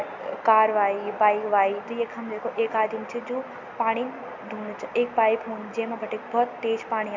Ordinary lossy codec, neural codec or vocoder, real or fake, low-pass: MP3, 64 kbps; none; real; 7.2 kHz